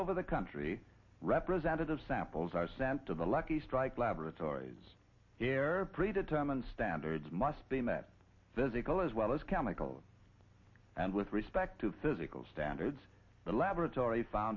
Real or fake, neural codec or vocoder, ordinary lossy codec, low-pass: real; none; MP3, 32 kbps; 7.2 kHz